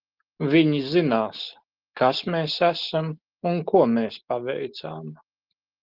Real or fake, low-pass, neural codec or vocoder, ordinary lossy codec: real; 5.4 kHz; none; Opus, 16 kbps